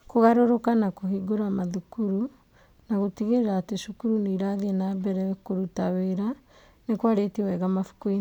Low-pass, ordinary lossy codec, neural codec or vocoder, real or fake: 19.8 kHz; none; none; real